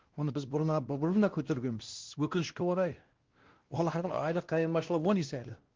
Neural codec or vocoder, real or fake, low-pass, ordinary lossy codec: codec, 16 kHz, 1 kbps, X-Codec, WavLM features, trained on Multilingual LibriSpeech; fake; 7.2 kHz; Opus, 16 kbps